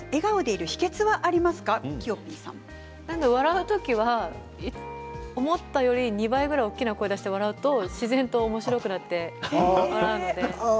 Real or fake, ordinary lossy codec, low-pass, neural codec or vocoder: real; none; none; none